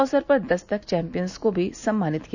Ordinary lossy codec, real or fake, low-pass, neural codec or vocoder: none; real; 7.2 kHz; none